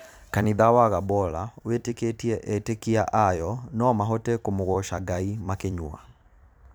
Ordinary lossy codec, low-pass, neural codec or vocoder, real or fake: none; none; vocoder, 44.1 kHz, 128 mel bands every 256 samples, BigVGAN v2; fake